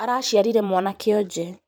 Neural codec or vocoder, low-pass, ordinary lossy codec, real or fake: vocoder, 44.1 kHz, 128 mel bands every 512 samples, BigVGAN v2; none; none; fake